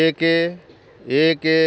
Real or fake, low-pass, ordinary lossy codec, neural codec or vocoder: real; none; none; none